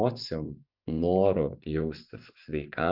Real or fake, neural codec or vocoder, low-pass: fake; vocoder, 24 kHz, 100 mel bands, Vocos; 5.4 kHz